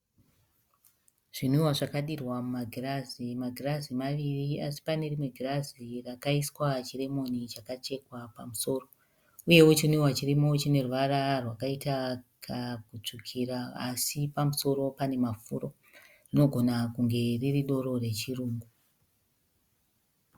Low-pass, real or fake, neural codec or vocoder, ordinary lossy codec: 19.8 kHz; real; none; Opus, 64 kbps